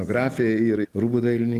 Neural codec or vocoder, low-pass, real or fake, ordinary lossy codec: none; 14.4 kHz; real; Opus, 24 kbps